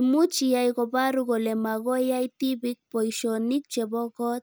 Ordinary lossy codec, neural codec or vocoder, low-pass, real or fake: none; none; none; real